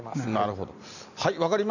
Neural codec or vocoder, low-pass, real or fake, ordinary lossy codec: none; 7.2 kHz; real; none